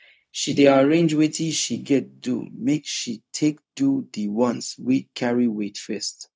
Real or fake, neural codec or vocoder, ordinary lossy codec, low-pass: fake; codec, 16 kHz, 0.4 kbps, LongCat-Audio-Codec; none; none